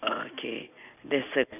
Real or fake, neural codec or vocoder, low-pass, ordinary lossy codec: real; none; 3.6 kHz; none